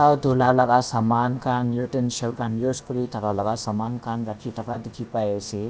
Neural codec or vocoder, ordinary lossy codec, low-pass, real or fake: codec, 16 kHz, about 1 kbps, DyCAST, with the encoder's durations; none; none; fake